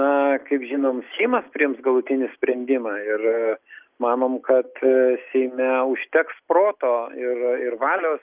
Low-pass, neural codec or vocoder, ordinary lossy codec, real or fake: 3.6 kHz; none; Opus, 24 kbps; real